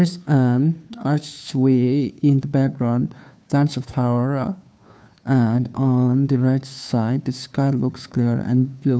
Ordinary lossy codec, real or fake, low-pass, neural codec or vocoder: none; fake; none; codec, 16 kHz, 2 kbps, FunCodec, trained on Chinese and English, 25 frames a second